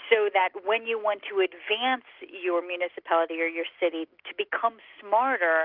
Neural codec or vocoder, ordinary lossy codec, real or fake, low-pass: none; Opus, 32 kbps; real; 5.4 kHz